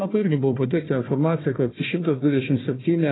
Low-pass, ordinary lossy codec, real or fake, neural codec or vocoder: 7.2 kHz; AAC, 16 kbps; fake; codec, 16 kHz, 1 kbps, FunCodec, trained on Chinese and English, 50 frames a second